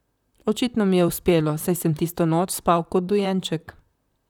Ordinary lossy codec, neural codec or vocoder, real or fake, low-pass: none; vocoder, 44.1 kHz, 128 mel bands, Pupu-Vocoder; fake; 19.8 kHz